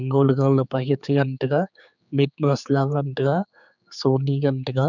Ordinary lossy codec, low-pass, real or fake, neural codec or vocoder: none; 7.2 kHz; fake; codec, 16 kHz, 4 kbps, X-Codec, HuBERT features, trained on general audio